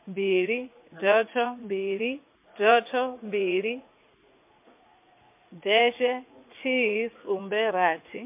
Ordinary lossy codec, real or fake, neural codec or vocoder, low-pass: MP3, 24 kbps; fake; vocoder, 44.1 kHz, 80 mel bands, Vocos; 3.6 kHz